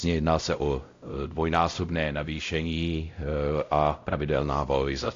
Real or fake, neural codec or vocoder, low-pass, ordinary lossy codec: fake; codec, 16 kHz, 0.5 kbps, X-Codec, WavLM features, trained on Multilingual LibriSpeech; 7.2 kHz; AAC, 48 kbps